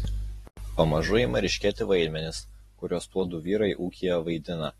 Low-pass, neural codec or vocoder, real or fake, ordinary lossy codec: 14.4 kHz; none; real; AAC, 32 kbps